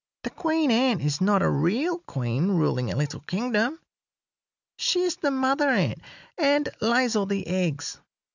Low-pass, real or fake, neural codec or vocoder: 7.2 kHz; real; none